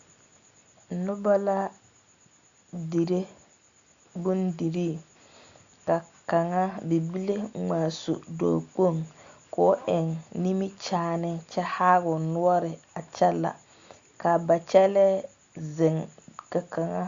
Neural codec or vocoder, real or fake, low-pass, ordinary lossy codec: none; real; 7.2 kHz; Opus, 64 kbps